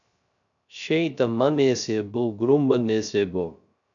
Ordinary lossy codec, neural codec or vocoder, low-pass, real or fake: MP3, 96 kbps; codec, 16 kHz, 0.3 kbps, FocalCodec; 7.2 kHz; fake